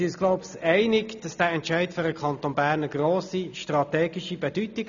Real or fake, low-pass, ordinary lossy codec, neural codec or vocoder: real; 7.2 kHz; none; none